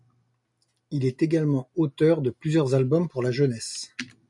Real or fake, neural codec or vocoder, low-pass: real; none; 10.8 kHz